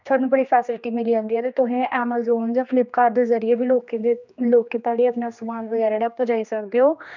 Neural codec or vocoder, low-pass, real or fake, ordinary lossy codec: codec, 16 kHz, 2 kbps, X-Codec, HuBERT features, trained on general audio; 7.2 kHz; fake; none